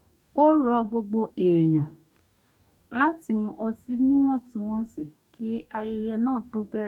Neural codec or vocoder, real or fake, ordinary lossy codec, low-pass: codec, 44.1 kHz, 2.6 kbps, DAC; fake; none; 19.8 kHz